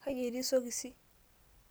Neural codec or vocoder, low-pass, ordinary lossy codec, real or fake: none; none; none; real